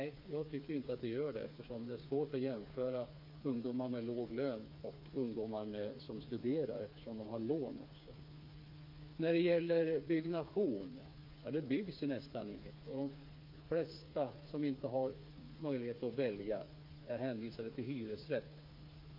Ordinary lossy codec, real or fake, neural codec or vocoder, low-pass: MP3, 32 kbps; fake; codec, 16 kHz, 4 kbps, FreqCodec, smaller model; 5.4 kHz